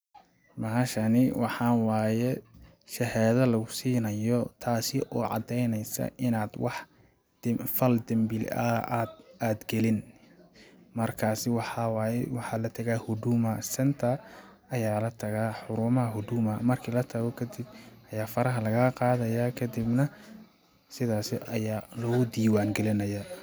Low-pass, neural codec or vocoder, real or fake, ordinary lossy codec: none; none; real; none